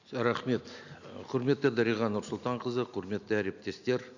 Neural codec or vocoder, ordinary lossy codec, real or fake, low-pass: none; none; real; 7.2 kHz